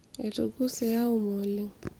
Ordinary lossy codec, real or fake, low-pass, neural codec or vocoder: Opus, 24 kbps; fake; 19.8 kHz; vocoder, 44.1 kHz, 128 mel bands every 256 samples, BigVGAN v2